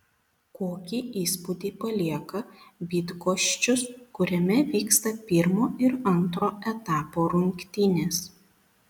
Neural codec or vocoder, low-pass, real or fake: none; 19.8 kHz; real